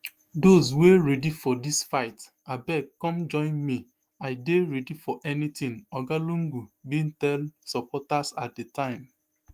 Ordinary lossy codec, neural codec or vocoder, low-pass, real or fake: Opus, 32 kbps; autoencoder, 48 kHz, 128 numbers a frame, DAC-VAE, trained on Japanese speech; 14.4 kHz; fake